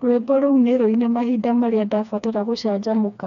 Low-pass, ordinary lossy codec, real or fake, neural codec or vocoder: 7.2 kHz; none; fake; codec, 16 kHz, 2 kbps, FreqCodec, smaller model